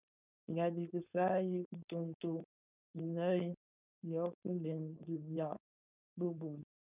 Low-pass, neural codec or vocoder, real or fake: 3.6 kHz; codec, 16 kHz, 4.8 kbps, FACodec; fake